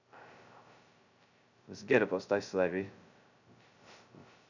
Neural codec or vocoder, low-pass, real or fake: codec, 16 kHz, 0.2 kbps, FocalCodec; 7.2 kHz; fake